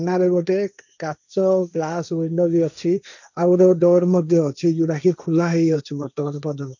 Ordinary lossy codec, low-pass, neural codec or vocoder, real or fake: none; 7.2 kHz; codec, 16 kHz, 1.1 kbps, Voila-Tokenizer; fake